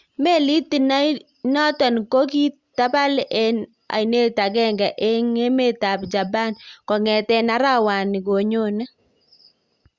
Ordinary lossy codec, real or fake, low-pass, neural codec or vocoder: Opus, 64 kbps; real; 7.2 kHz; none